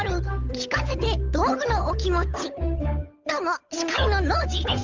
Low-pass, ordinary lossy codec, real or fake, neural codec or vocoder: 7.2 kHz; Opus, 32 kbps; fake; codec, 16 kHz, 8 kbps, FunCodec, trained on Chinese and English, 25 frames a second